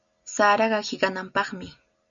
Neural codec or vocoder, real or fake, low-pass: none; real; 7.2 kHz